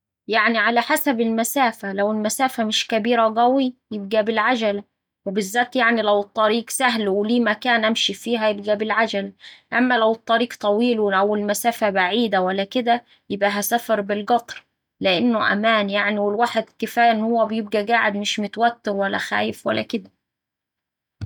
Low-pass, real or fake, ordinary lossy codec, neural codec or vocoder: 19.8 kHz; real; none; none